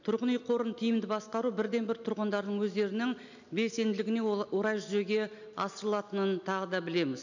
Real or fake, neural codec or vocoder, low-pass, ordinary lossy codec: real; none; 7.2 kHz; none